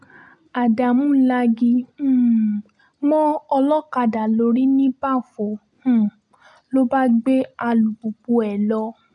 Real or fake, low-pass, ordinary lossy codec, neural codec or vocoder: real; 10.8 kHz; none; none